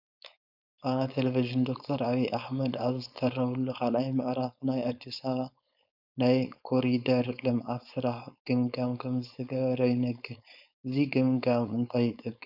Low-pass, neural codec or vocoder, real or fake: 5.4 kHz; codec, 16 kHz, 4.8 kbps, FACodec; fake